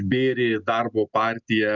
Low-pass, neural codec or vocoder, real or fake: 7.2 kHz; none; real